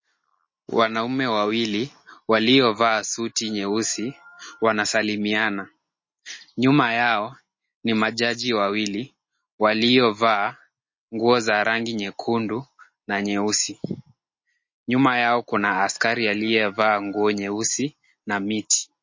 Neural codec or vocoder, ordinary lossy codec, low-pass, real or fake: none; MP3, 32 kbps; 7.2 kHz; real